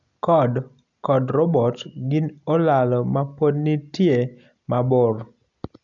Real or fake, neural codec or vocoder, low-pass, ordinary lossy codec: real; none; 7.2 kHz; none